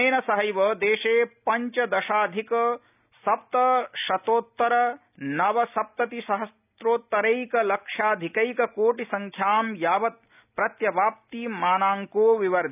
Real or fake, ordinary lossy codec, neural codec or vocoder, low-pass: real; none; none; 3.6 kHz